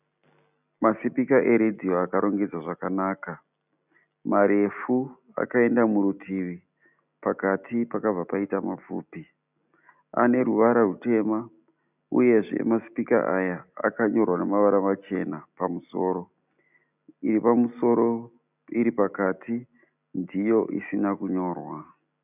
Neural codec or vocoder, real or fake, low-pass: none; real; 3.6 kHz